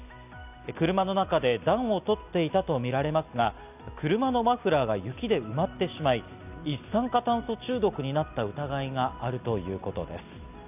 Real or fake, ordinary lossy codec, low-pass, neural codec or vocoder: real; none; 3.6 kHz; none